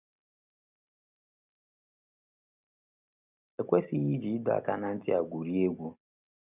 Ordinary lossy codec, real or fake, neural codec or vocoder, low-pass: none; real; none; 3.6 kHz